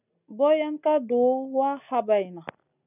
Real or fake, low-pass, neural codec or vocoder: real; 3.6 kHz; none